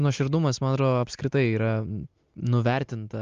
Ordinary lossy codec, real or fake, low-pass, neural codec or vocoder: Opus, 32 kbps; real; 7.2 kHz; none